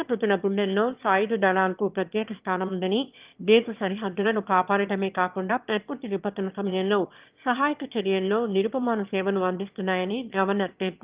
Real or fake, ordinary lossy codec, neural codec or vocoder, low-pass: fake; Opus, 32 kbps; autoencoder, 22.05 kHz, a latent of 192 numbers a frame, VITS, trained on one speaker; 3.6 kHz